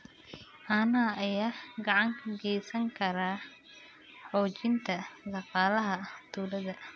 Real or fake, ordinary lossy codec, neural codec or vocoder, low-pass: real; none; none; none